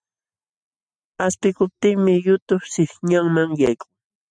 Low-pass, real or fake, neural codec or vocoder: 9.9 kHz; real; none